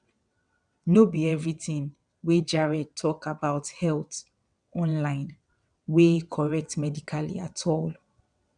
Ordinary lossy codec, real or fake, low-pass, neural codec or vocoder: none; fake; 9.9 kHz; vocoder, 22.05 kHz, 80 mel bands, WaveNeXt